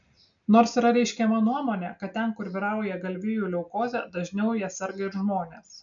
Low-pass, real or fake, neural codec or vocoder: 7.2 kHz; real; none